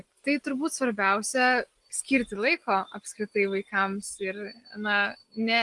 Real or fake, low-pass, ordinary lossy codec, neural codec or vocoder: real; 10.8 kHz; Opus, 24 kbps; none